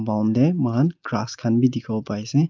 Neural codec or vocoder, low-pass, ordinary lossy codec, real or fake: none; 7.2 kHz; Opus, 24 kbps; real